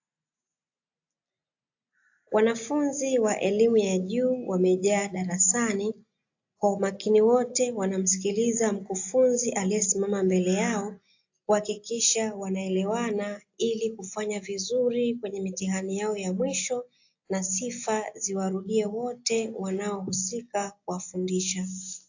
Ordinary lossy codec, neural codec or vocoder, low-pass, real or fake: AAC, 48 kbps; none; 7.2 kHz; real